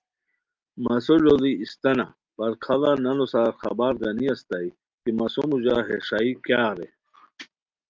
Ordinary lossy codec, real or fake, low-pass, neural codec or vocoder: Opus, 24 kbps; real; 7.2 kHz; none